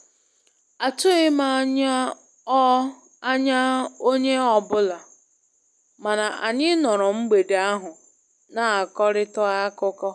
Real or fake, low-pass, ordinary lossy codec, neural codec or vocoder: real; none; none; none